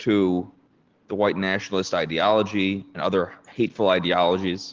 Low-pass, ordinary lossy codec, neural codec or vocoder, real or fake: 7.2 kHz; Opus, 16 kbps; none; real